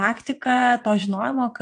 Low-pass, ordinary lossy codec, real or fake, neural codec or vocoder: 9.9 kHz; AAC, 48 kbps; fake; vocoder, 22.05 kHz, 80 mel bands, WaveNeXt